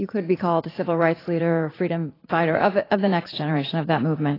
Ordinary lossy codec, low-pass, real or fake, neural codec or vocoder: AAC, 24 kbps; 5.4 kHz; fake; vocoder, 44.1 kHz, 80 mel bands, Vocos